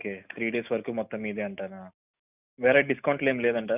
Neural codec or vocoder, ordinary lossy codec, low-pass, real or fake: none; none; 3.6 kHz; real